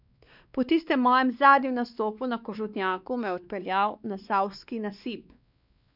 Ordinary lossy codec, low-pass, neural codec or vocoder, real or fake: none; 5.4 kHz; codec, 16 kHz, 2 kbps, X-Codec, WavLM features, trained on Multilingual LibriSpeech; fake